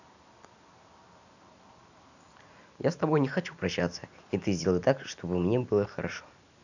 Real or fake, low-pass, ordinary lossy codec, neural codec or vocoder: real; 7.2 kHz; none; none